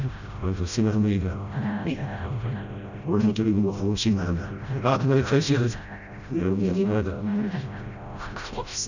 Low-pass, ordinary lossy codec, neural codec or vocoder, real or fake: 7.2 kHz; none; codec, 16 kHz, 0.5 kbps, FreqCodec, smaller model; fake